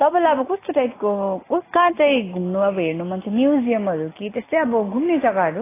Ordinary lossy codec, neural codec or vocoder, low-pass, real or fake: AAC, 16 kbps; none; 3.6 kHz; real